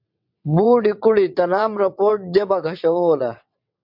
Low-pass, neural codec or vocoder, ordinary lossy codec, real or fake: 5.4 kHz; vocoder, 22.05 kHz, 80 mel bands, WaveNeXt; MP3, 48 kbps; fake